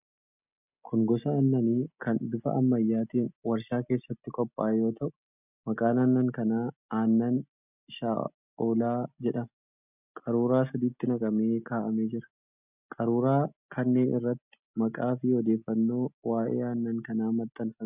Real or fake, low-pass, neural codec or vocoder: real; 3.6 kHz; none